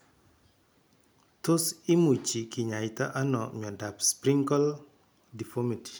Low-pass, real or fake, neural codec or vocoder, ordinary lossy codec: none; real; none; none